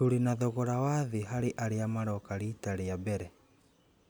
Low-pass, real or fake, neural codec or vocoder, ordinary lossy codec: none; real; none; none